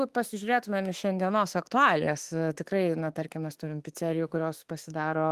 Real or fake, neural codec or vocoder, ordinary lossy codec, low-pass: fake; autoencoder, 48 kHz, 32 numbers a frame, DAC-VAE, trained on Japanese speech; Opus, 24 kbps; 14.4 kHz